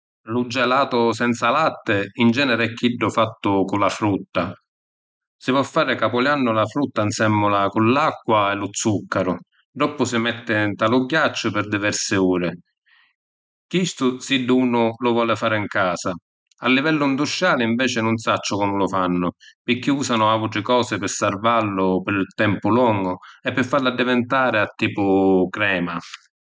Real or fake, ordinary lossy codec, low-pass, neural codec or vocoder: real; none; none; none